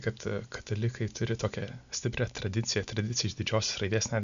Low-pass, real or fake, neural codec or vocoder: 7.2 kHz; real; none